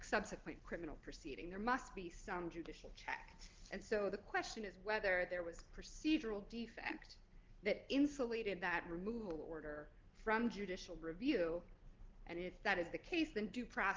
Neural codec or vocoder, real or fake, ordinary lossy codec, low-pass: none; real; Opus, 16 kbps; 7.2 kHz